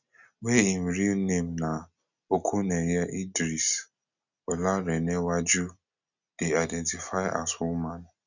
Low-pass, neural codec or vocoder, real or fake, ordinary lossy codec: 7.2 kHz; none; real; none